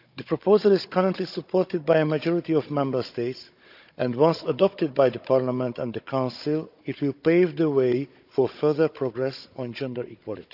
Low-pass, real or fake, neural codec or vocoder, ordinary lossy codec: 5.4 kHz; fake; codec, 16 kHz, 16 kbps, FunCodec, trained on Chinese and English, 50 frames a second; none